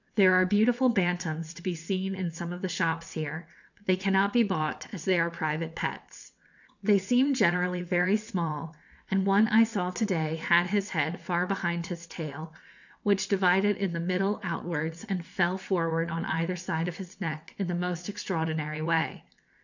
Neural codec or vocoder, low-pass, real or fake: vocoder, 22.05 kHz, 80 mel bands, WaveNeXt; 7.2 kHz; fake